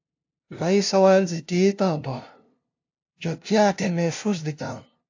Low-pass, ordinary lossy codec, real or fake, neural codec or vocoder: 7.2 kHz; AAC, 48 kbps; fake; codec, 16 kHz, 0.5 kbps, FunCodec, trained on LibriTTS, 25 frames a second